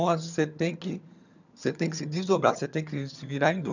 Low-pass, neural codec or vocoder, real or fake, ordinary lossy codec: 7.2 kHz; vocoder, 22.05 kHz, 80 mel bands, HiFi-GAN; fake; none